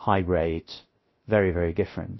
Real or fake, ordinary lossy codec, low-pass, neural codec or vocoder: fake; MP3, 24 kbps; 7.2 kHz; codec, 16 kHz, 0.3 kbps, FocalCodec